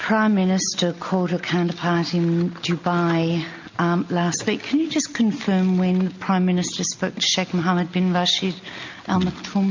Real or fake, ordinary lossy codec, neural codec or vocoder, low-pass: real; MP3, 48 kbps; none; 7.2 kHz